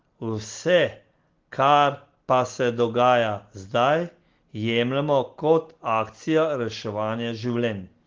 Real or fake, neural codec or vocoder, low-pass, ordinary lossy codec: real; none; 7.2 kHz; Opus, 16 kbps